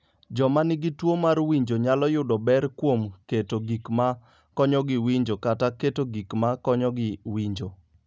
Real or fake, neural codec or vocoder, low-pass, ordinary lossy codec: real; none; none; none